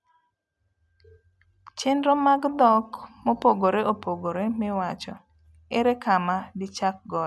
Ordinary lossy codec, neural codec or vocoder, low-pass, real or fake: none; none; none; real